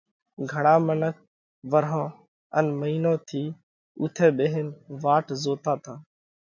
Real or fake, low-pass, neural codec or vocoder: real; 7.2 kHz; none